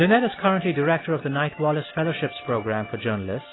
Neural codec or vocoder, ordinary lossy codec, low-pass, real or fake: none; AAC, 16 kbps; 7.2 kHz; real